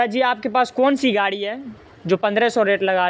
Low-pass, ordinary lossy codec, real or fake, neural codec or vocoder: none; none; real; none